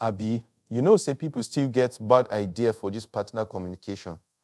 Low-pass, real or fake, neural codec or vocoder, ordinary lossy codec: none; fake; codec, 24 kHz, 0.5 kbps, DualCodec; none